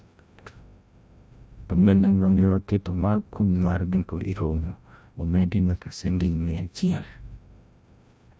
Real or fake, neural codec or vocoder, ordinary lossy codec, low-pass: fake; codec, 16 kHz, 0.5 kbps, FreqCodec, larger model; none; none